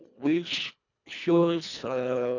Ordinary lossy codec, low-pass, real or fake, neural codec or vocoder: none; 7.2 kHz; fake; codec, 24 kHz, 1.5 kbps, HILCodec